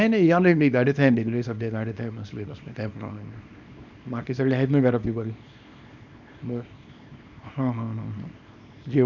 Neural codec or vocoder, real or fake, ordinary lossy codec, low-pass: codec, 24 kHz, 0.9 kbps, WavTokenizer, small release; fake; none; 7.2 kHz